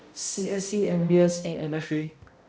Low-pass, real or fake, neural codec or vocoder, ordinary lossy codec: none; fake; codec, 16 kHz, 0.5 kbps, X-Codec, HuBERT features, trained on balanced general audio; none